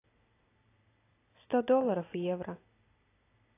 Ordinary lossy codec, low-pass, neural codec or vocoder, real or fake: AAC, 24 kbps; 3.6 kHz; none; real